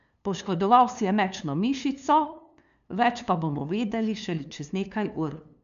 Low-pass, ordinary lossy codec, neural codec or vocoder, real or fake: 7.2 kHz; none; codec, 16 kHz, 2 kbps, FunCodec, trained on LibriTTS, 25 frames a second; fake